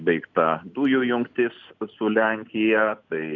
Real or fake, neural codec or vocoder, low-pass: real; none; 7.2 kHz